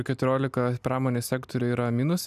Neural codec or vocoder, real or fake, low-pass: none; real; 14.4 kHz